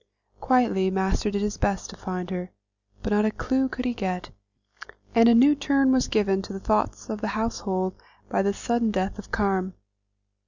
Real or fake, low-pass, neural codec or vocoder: real; 7.2 kHz; none